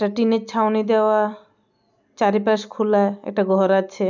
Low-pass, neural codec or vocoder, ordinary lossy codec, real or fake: 7.2 kHz; none; none; real